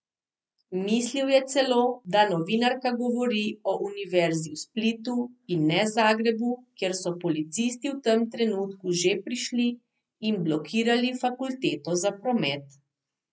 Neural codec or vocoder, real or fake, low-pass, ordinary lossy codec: none; real; none; none